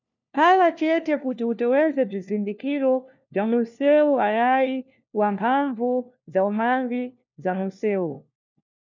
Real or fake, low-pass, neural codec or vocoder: fake; 7.2 kHz; codec, 16 kHz, 1 kbps, FunCodec, trained on LibriTTS, 50 frames a second